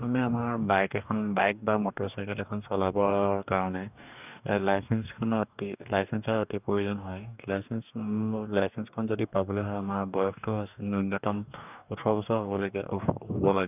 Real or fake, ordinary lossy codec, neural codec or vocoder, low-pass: fake; none; codec, 44.1 kHz, 2.6 kbps, DAC; 3.6 kHz